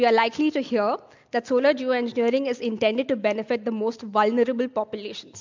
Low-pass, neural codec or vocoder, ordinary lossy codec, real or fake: 7.2 kHz; none; MP3, 64 kbps; real